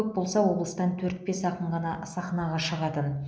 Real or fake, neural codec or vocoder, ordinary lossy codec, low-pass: real; none; none; none